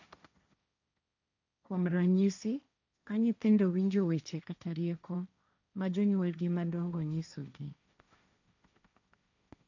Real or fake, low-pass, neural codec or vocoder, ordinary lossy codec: fake; 7.2 kHz; codec, 16 kHz, 1.1 kbps, Voila-Tokenizer; none